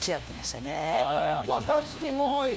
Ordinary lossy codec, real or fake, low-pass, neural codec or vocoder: none; fake; none; codec, 16 kHz, 1 kbps, FunCodec, trained on LibriTTS, 50 frames a second